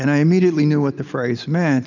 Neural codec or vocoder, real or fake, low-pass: none; real; 7.2 kHz